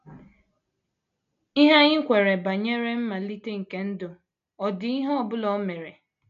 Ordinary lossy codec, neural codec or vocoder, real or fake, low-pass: none; none; real; 7.2 kHz